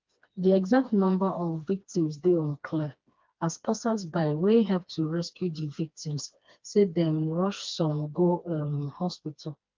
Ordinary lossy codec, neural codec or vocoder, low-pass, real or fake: Opus, 24 kbps; codec, 16 kHz, 2 kbps, FreqCodec, smaller model; 7.2 kHz; fake